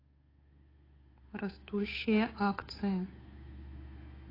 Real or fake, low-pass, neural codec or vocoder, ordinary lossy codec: fake; 5.4 kHz; codec, 16 kHz, 8 kbps, FunCodec, trained on Chinese and English, 25 frames a second; AAC, 24 kbps